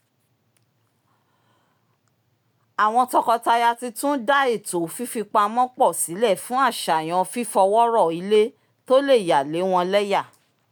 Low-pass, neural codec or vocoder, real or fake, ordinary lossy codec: none; none; real; none